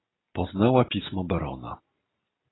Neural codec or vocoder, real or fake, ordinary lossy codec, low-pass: none; real; AAC, 16 kbps; 7.2 kHz